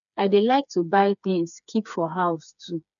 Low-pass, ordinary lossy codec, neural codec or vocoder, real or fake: 7.2 kHz; none; codec, 16 kHz, 4 kbps, FreqCodec, smaller model; fake